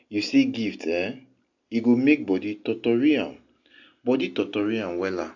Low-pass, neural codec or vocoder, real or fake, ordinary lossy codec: 7.2 kHz; none; real; AAC, 48 kbps